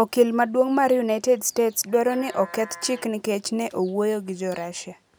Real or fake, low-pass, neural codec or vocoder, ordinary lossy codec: real; none; none; none